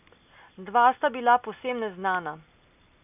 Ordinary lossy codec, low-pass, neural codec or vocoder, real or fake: none; 3.6 kHz; none; real